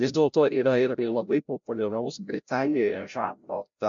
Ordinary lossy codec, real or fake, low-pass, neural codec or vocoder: MP3, 64 kbps; fake; 7.2 kHz; codec, 16 kHz, 0.5 kbps, FreqCodec, larger model